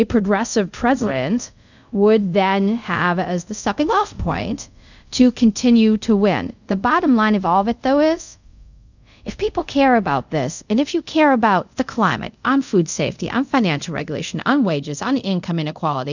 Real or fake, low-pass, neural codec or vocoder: fake; 7.2 kHz; codec, 24 kHz, 0.5 kbps, DualCodec